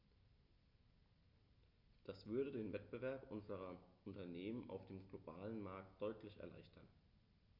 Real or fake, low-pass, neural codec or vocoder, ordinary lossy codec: real; 5.4 kHz; none; none